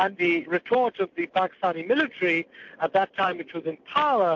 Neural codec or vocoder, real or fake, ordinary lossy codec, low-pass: none; real; MP3, 48 kbps; 7.2 kHz